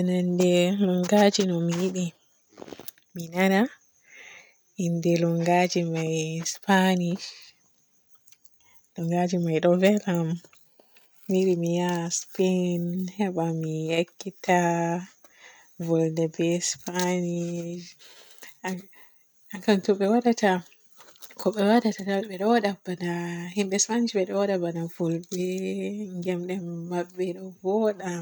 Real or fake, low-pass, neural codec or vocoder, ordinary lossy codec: real; none; none; none